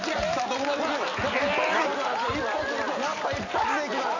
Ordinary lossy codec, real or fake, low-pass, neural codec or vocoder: none; real; 7.2 kHz; none